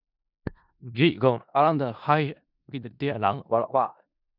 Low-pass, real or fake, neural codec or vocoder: 5.4 kHz; fake; codec, 16 kHz in and 24 kHz out, 0.4 kbps, LongCat-Audio-Codec, four codebook decoder